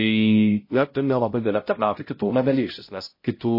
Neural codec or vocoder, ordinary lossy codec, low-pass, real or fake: codec, 16 kHz, 0.5 kbps, X-Codec, HuBERT features, trained on balanced general audio; MP3, 24 kbps; 5.4 kHz; fake